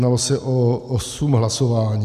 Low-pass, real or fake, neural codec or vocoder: 14.4 kHz; real; none